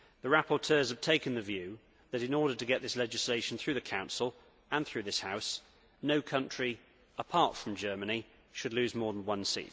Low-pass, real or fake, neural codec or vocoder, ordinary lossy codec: none; real; none; none